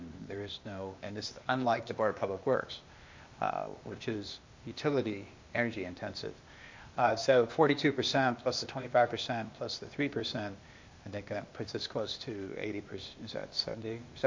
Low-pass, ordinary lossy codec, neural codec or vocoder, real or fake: 7.2 kHz; MP3, 48 kbps; codec, 16 kHz, 0.8 kbps, ZipCodec; fake